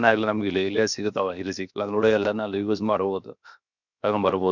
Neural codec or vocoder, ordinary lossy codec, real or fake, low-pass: codec, 16 kHz, 0.7 kbps, FocalCodec; none; fake; 7.2 kHz